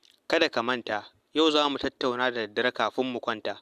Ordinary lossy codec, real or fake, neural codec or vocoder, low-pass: Opus, 64 kbps; real; none; 14.4 kHz